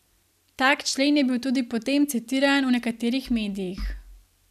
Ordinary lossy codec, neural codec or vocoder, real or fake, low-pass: none; none; real; 14.4 kHz